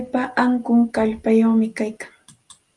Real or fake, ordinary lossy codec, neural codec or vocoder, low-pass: real; Opus, 32 kbps; none; 10.8 kHz